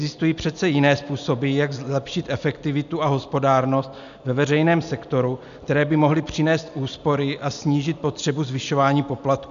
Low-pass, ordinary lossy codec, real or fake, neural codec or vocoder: 7.2 kHz; MP3, 96 kbps; real; none